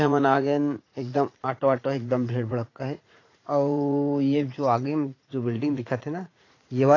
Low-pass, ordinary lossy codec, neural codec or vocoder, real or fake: 7.2 kHz; AAC, 32 kbps; vocoder, 44.1 kHz, 80 mel bands, Vocos; fake